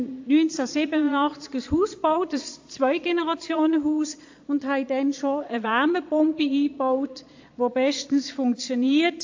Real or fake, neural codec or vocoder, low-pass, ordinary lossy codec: fake; vocoder, 22.05 kHz, 80 mel bands, Vocos; 7.2 kHz; AAC, 48 kbps